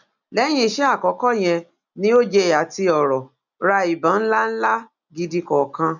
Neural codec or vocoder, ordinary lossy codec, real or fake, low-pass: none; none; real; 7.2 kHz